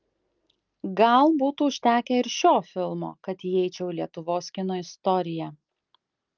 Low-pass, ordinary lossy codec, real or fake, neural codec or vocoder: 7.2 kHz; Opus, 24 kbps; real; none